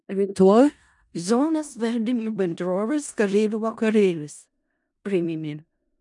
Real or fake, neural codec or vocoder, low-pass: fake; codec, 16 kHz in and 24 kHz out, 0.4 kbps, LongCat-Audio-Codec, four codebook decoder; 10.8 kHz